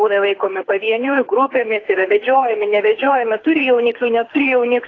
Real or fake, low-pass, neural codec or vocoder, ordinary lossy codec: fake; 7.2 kHz; codec, 24 kHz, 6 kbps, HILCodec; AAC, 32 kbps